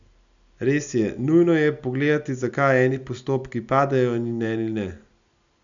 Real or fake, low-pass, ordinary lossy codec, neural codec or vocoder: real; 7.2 kHz; none; none